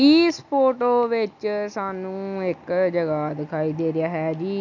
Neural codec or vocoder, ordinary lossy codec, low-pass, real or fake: none; none; 7.2 kHz; real